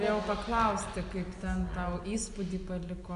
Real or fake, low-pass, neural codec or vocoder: real; 10.8 kHz; none